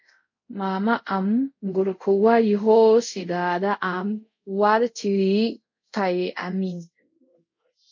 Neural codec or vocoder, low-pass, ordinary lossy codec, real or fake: codec, 24 kHz, 0.5 kbps, DualCodec; 7.2 kHz; MP3, 48 kbps; fake